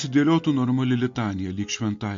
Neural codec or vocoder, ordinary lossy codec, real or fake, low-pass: none; MP3, 48 kbps; real; 7.2 kHz